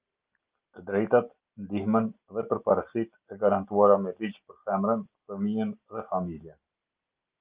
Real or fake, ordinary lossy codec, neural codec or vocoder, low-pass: real; Opus, 32 kbps; none; 3.6 kHz